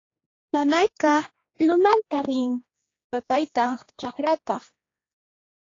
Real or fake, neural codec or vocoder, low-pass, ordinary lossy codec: fake; codec, 16 kHz, 2 kbps, X-Codec, HuBERT features, trained on general audio; 7.2 kHz; AAC, 32 kbps